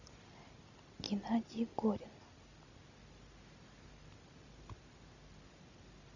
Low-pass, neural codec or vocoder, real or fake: 7.2 kHz; none; real